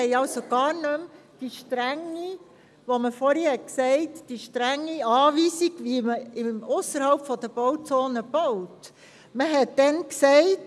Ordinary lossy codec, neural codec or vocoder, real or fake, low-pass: none; none; real; none